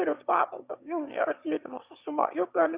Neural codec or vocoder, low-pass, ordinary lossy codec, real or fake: autoencoder, 22.05 kHz, a latent of 192 numbers a frame, VITS, trained on one speaker; 3.6 kHz; Opus, 64 kbps; fake